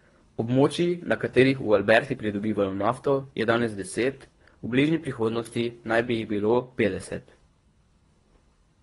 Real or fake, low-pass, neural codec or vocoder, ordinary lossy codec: fake; 10.8 kHz; codec, 24 kHz, 3 kbps, HILCodec; AAC, 32 kbps